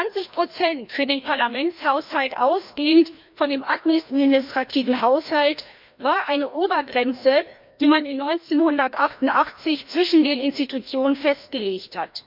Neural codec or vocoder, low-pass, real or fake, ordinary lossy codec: codec, 16 kHz, 1 kbps, FreqCodec, larger model; 5.4 kHz; fake; MP3, 48 kbps